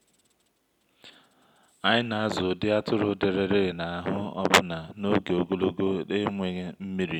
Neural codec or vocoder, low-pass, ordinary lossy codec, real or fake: none; 19.8 kHz; none; real